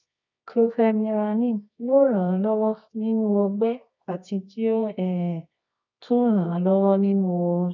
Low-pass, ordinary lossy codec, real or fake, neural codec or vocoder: 7.2 kHz; none; fake; codec, 24 kHz, 0.9 kbps, WavTokenizer, medium music audio release